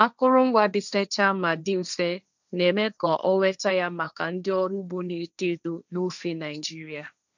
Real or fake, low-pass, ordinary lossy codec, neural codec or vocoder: fake; 7.2 kHz; none; codec, 16 kHz, 1.1 kbps, Voila-Tokenizer